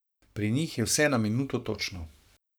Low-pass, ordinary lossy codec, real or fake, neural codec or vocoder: none; none; fake; codec, 44.1 kHz, 7.8 kbps, Pupu-Codec